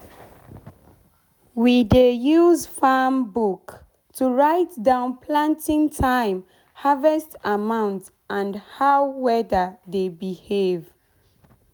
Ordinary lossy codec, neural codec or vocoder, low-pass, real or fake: none; none; none; real